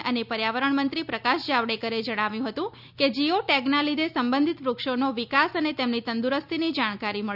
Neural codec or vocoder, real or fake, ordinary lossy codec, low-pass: none; real; none; 5.4 kHz